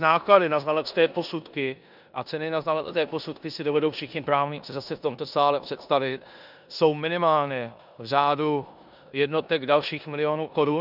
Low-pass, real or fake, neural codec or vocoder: 5.4 kHz; fake; codec, 16 kHz in and 24 kHz out, 0.9 kbps, LongCat-Audio-Codec, four codebook decoder